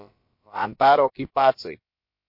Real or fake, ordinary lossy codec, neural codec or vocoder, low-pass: fake; MP3, 32 kbps; codec, 16 kHz, about 1 kbps, DyCAST, with the encoder's durations; 5.4 kHz